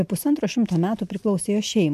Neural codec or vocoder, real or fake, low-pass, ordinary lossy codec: none; real; 14.4 kHz; AAC, 96 kbps